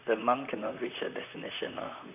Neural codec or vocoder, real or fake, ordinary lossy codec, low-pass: vocoder, 44.1 kHz, 128 mel bands, Pupu-Vocoder; fake; none; 3.6 kHz